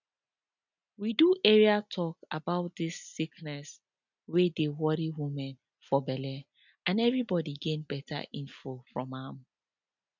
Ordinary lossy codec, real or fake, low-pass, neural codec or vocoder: none; real; 7.2 kHz; none